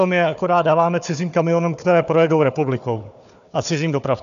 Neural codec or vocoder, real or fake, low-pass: codec, 16 kHz, 4 kbps, FunCodec, trained on Chinese and English, 50 frames a second; fake; 7.2 kHz